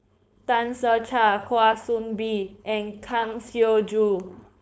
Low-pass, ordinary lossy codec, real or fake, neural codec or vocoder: none; none; fake; codec, 16 kHz, 4.8 kbps, FACodec